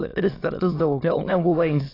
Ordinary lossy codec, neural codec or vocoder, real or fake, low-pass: AAC, 24 kbps; autoencoder, 22.05 kHz, a latent of 192 numbers a frame, VITS, trained on many speakers; fake; 5.4 kHz